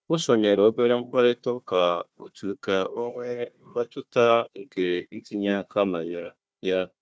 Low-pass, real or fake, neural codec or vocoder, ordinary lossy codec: none; fake; codec, 16 kHz, 1 kbps, FunCodec, trained on Chinese and English, 50 frames a second; none